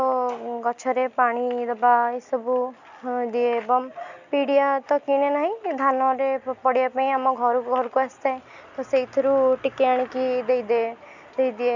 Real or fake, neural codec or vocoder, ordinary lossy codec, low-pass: real; none; none; 7.2 kHz